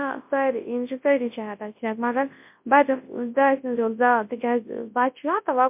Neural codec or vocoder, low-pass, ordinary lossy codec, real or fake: codec, 24 kHz, 0.9 kbps, WavTokenizer, large speech release; 3.6 kHz; MP3, 32 kbps; fake